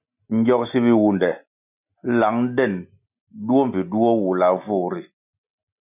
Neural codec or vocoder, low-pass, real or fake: none; 3.6 kHz; real